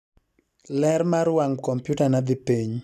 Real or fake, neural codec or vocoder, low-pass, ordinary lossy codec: real; none; 14.4 kHz; none